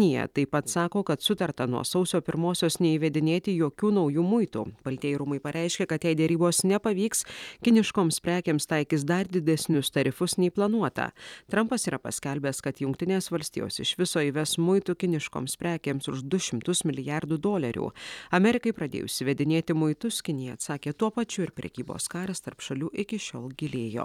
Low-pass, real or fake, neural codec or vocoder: 19.8 kHz; fake; vocoder, 44.1 kHz, 128 mel bands every 256 samples, BigVGAN v2